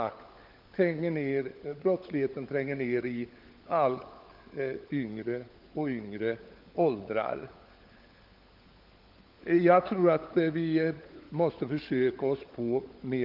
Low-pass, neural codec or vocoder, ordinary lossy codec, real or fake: 5.4 kHz; codec, 16 kHz, 16 kbps, FunCodec, trained on LibriTTS, 50 frames a second; Opus, 24 kbps; fake